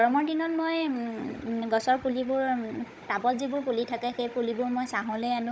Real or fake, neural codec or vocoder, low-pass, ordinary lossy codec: fake; codec, 16 kHz, 16 kbps, FunCodec, trained on Chinese and English, 50 frames a second; none; none